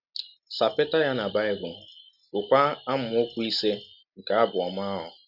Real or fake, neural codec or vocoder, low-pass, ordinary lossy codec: real; none; 5.4 kHz; none